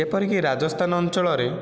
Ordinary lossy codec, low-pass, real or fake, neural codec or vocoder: none; none; real; none